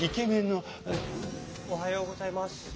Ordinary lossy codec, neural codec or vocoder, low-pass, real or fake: none; none; none; real